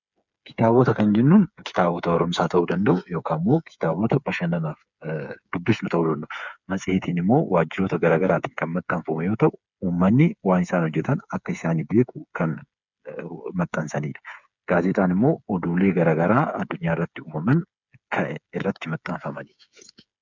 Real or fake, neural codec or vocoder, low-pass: fake; codec, 16 kHz, 8 kbps, FreqCodec, smaller model; 7.2 kHz